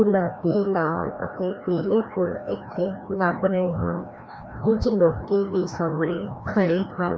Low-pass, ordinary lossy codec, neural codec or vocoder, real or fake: none; none; codec, 16 kHz, 1 kbps, FreqCodec, larger model; fake